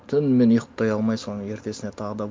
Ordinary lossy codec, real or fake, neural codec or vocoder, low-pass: none; real; none; none